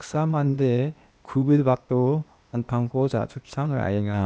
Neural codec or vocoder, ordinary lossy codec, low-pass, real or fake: codec, 16 kHz, 0.8 kbps, ZipCodec; none; none; fake